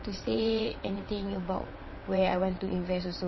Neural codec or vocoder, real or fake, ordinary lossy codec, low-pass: vocoder, 22.05 kHz, 80 mel bands, WaveNeXt; fake; MP3, 24 kbps; 7.2 kHz